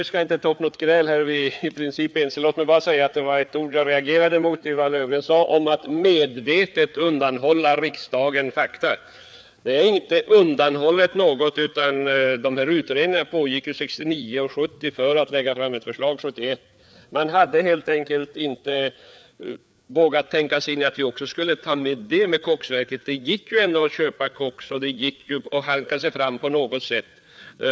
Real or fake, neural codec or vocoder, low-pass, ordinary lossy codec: fake; codec, 16 kHz, 4 kbps, FreqCodec, larger model; none; none